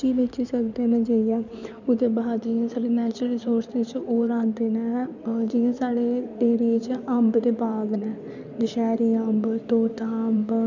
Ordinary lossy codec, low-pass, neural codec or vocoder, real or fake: none; 7.2 kHz; codec, 16 kHz in and 24 kHz out, 2.2 kbps, FireRedTTS-2 codec; fake